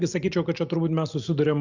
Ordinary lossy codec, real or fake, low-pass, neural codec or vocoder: Opus, 64 kbps; real; 7.2 kHz; none